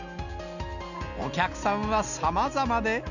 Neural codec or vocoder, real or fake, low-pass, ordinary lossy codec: none; real; 7.2 kHz; none